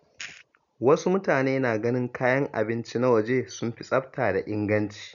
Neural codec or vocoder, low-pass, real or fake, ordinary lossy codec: none; 7.2 kHz; real; none